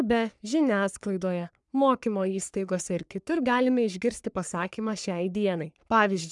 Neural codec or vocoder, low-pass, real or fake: codec, 44.1 kHz, 3.4 kbps, Pupu-Codec; 10.8 kHz; fake